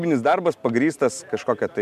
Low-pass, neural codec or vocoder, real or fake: 14.4 kHz; none; real